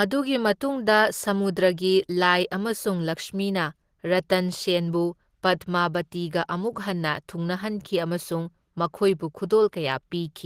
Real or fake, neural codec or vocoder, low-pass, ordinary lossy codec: real; none; 14.4 kHz; Opus, 16 kbps